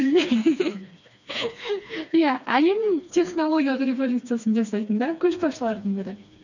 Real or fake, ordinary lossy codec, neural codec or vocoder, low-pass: fake; none; codec, 16 kHz, 2 kbps, FreqCodec, smaller model; 7.2 kHz